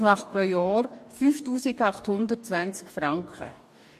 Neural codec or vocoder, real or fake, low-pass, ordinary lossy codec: codec, 44.1 kHz, 2.6 kbps, DAC; fake; 14.4 kHz; MP3, 64 kbps